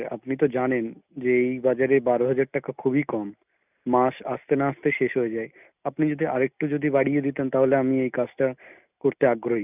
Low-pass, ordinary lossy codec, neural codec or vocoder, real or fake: 3.6 kHz; none; none; real